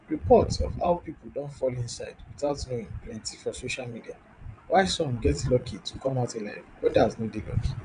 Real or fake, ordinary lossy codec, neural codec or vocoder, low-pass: fake; none; vocoder, 22.05 kHz, 80 mel bands, WaveNeXt; 9.9 kHz